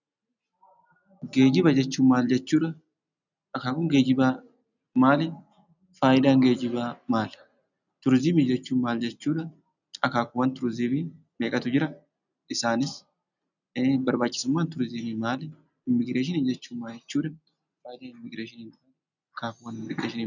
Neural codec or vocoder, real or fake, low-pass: none; real; 7.2 kHz